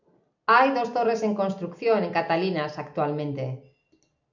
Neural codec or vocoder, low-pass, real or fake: none; 7.2 kHz; real